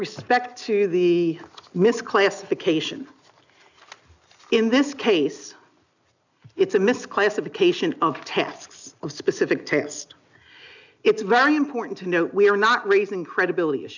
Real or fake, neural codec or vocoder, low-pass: real; none; 7.2 kHz